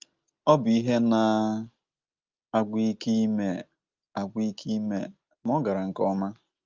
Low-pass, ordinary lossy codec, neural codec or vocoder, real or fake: 7.2 kHz; Opus, 32 kbps; none; real